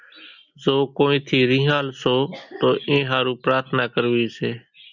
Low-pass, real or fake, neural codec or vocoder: 7.2 kHz; real; none